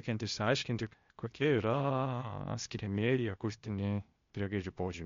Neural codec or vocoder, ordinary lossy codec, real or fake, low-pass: codec, 16 kHz, 0.8 kbps, ZipCodec; MP3, 48 kbps; fake; 7.2 kHz